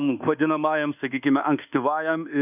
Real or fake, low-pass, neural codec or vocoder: fake; 3.6 kHz; codec, 24 kHz, 1.2 kbps, DualCodec